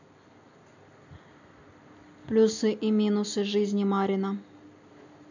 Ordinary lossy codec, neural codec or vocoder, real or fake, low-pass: none; none; real; 7.2 kHz